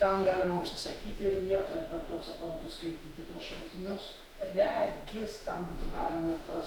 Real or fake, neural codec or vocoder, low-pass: fake; autoencoder, 48 kHz, 32 numbers a frame, DAC-VAE, trained on Japanese speech; 19.8 kHz